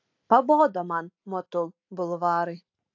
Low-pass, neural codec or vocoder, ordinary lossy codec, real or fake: 7.2 kHz; none; AAC, 48 kbps; real